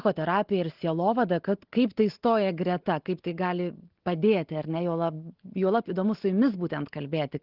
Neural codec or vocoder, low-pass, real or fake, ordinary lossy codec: none; 5.4 kHz; real; Opus, 16 kbps